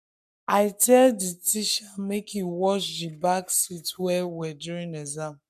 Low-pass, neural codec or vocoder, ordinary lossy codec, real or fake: 14.4 kHz; codec, 44.1 kHz, 7.8 kbps, Pupu-Codec; MP3, 96 kbps; fake